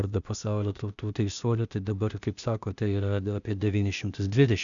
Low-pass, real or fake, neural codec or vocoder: 7.2 kHz; fake; codec, 16 kHz, 0.8 kbps, ZipCodec